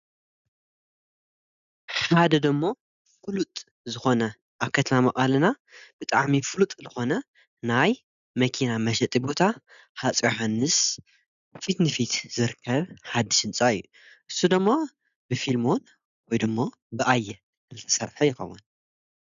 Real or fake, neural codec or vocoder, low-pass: real; none; 7.2 kHz